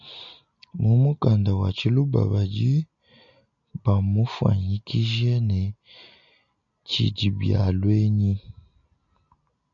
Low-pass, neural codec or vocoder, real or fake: 7.2 kHz; none; real